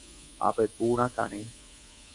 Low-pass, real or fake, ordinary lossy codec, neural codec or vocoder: 10.8 kHz; fake; AAC, 64 kbps; codec, 24 kHz, 3.1 kbps, DualCodec